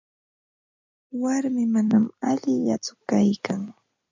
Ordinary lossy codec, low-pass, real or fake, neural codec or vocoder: MP3, 64 kbps; 7.2 kHz; fake; vocoder, 44.1 kHz, 128 mel bands every 256 samples, BigVGAN v2